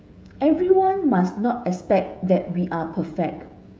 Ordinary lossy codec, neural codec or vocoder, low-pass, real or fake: none; codec, 16 kHz, 16 kbps, FreqCodec, smaller model; none; fake